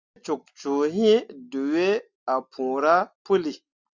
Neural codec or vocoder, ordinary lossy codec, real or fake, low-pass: none; Opus, 64 kbps; real; 7.2 kHz